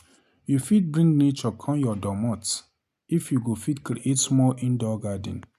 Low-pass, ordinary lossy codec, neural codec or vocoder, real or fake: 14.4 kHz; none; none; real